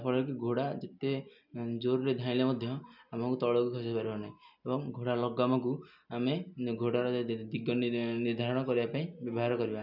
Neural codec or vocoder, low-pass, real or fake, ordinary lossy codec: none; 5.4 kHz; real; none